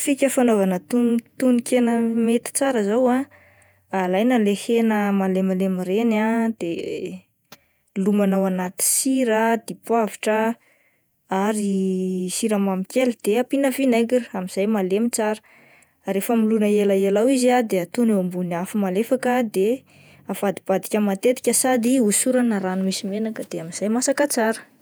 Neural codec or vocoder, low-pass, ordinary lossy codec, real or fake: vocoder, 48 kHz, 128 mel bands, Vocos; none; none; fake